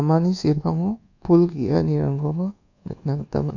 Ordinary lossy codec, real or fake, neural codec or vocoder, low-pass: none; fake; codec, 24 kHz, 1.2 kbps, DualCodec; 7.2 kHz